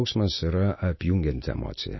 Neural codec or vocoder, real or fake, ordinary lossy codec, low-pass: none; real; MP3, 24 kbps; 7.2 kHz